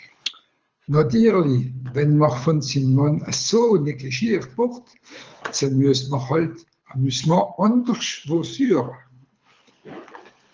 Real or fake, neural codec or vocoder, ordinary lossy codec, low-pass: fake; codec, 24 kHz, 6 kbps, HILCodec; Opus, 24 kbps; 7.2 kHz